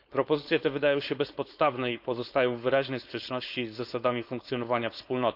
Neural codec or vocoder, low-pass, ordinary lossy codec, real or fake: codec, 16 kHz, 4.8 kbps, FACodec; 5.4 kHz; none; fake